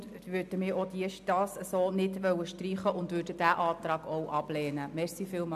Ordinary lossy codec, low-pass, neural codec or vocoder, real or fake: AAC, 96 kbps; 14.4 kHz; none; real